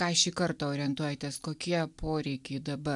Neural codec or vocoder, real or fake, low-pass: none; real; 10.8 kHz